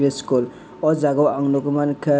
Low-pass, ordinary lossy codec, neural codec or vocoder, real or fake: none; none; none; real